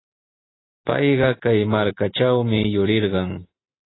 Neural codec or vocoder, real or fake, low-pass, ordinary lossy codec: none; real; 7.2 kHz; AAC, 16 kbps